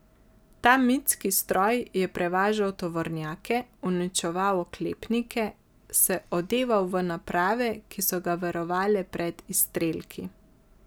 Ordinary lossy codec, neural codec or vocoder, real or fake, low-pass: none; none; real; none